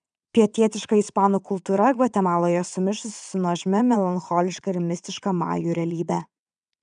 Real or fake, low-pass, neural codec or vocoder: fake; 9.9 kHz; vocoder, 22.05 kHz, 80 mel bands, WaveNeXt